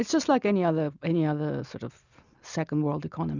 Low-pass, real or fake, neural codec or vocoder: 7.2 kHz; real; none